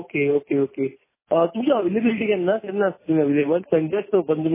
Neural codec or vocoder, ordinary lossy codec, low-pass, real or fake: none; MP3, 16 kbps; 3.6 kHz; real